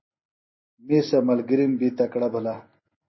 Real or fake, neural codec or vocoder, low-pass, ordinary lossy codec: real; none; 7.2 kHz; MP3, 24 kbps